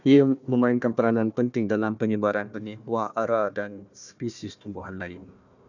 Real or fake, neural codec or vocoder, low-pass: fake; codec, 16 kHz, 1 kbps, FunCodec, trained on Chinese and English, 50 frames a second; 7.2 kHz